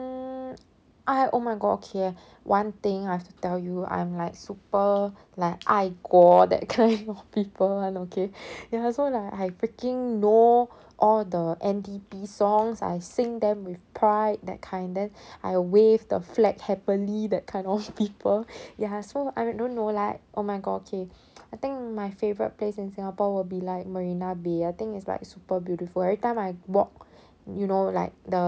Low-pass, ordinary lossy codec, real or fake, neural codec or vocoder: none; none; real; none